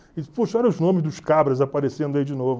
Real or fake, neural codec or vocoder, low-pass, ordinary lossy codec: real; none; none; none